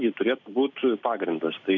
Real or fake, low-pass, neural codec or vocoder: real; 7.2 kHz; none